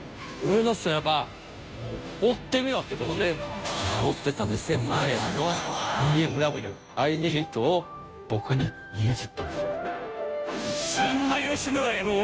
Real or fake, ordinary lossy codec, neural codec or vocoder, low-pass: fake; none; codec, 16 kHz, 0.5 kbps, FunCodec, trained on Chinese and English, 25 frames a second; none